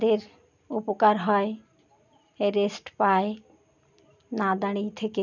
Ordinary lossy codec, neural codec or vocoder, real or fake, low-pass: none; none; real; 7.2 kHz